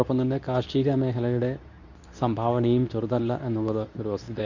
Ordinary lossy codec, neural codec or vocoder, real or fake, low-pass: none; codec, 24 kHz, 0.9 kbps, WavTokenizer, medium speech release version 2; fake; 7.2 kHz